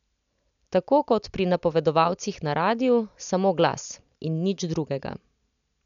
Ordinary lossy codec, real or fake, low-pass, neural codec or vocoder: none; real; 7.2 kHz; none